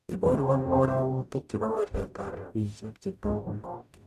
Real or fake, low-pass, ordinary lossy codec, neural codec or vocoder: fake; 14.4 kHz; none; codec, 44.1 kHz, 0.9 kbps, DAC